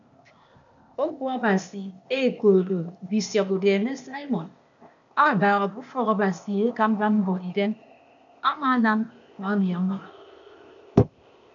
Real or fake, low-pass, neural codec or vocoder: fake; 7.2 kHz; codec, 16 kHz, 0.8 kbps, ZipCodec